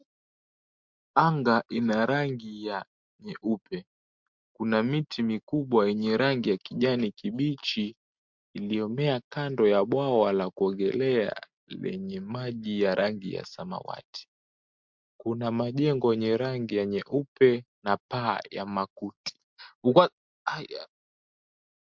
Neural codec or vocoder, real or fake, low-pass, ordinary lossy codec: none; real; 7.2 kHz; MP3, 64 kbps